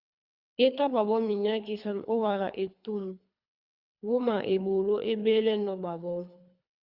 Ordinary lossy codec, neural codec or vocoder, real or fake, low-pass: Opus, 64 kbps; codec, 24 kHz, 3 kbps, HILCodec; fake; 5.4 kHz